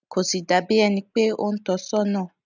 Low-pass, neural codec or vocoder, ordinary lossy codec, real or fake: 7.2 kHz; none; none; real